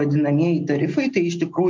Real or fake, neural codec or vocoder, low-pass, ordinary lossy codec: real; none; 7.2 kHz; MP3, 48 kbps